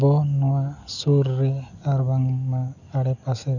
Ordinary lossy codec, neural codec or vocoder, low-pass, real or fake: none; none; 7.2 kHz; real